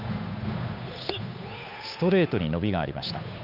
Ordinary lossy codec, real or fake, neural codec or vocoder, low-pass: none; fake; codec, 16 kHz, 8 kbps, FunCodec, trained on Chinese and English, 25 frames a second; 5.4 kHz